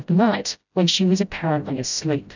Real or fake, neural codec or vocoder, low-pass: fake; codec, 16 kHz, 0.5 kbps, FreqCodec, smaller model; 7.2 kHz